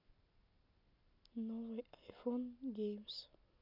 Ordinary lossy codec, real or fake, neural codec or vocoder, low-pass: none; real; none; 5.4 kHz